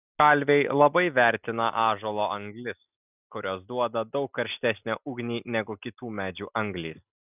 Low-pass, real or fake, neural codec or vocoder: 3.6 kHz; real; none